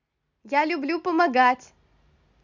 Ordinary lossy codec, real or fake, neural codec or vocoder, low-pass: none; real; none; 7.2 kHz